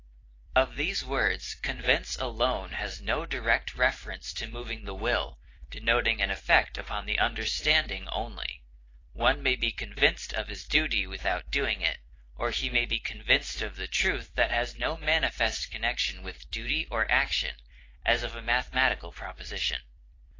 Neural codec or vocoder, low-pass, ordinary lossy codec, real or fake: vocoder, 44.1 kHz, 128 mel bands every 256 samples, BigVGAN v2; 7.2 kHz; AAC, 32 kbps; fake